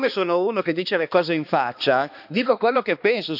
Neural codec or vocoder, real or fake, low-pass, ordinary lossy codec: codec, 16 kHz, 2 kbps, X-Codec, HuBERT features, trained on balanced general audio; fake; 5.4 kHz; none